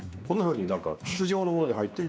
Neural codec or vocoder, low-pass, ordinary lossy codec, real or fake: codec, 16 kHz, 2 kbps, X-Codec, WavLM features, trained on Multilingual LibriSpeech; none; none; fake